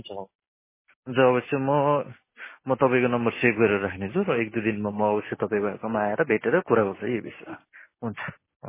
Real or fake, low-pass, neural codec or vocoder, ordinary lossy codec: real; 3.6 kHz; none; MP3, 16 kbps